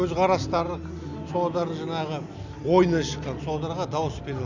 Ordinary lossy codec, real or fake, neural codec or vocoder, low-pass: none; real; none; 7.2 kHz